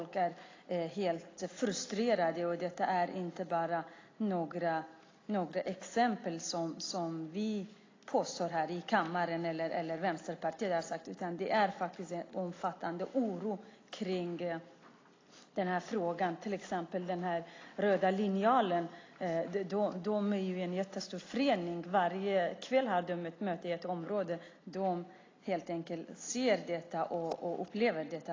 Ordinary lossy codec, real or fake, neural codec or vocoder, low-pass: AAC, 32 kbps; real; none; 7.2 kHz